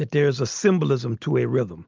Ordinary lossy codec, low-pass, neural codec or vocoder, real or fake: Opus, 24 kbps; 7.2 kHz; none; real